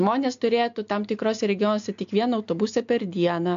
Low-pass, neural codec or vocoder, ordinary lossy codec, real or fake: 7.2 kHz; none; AAC, 64 kbps; real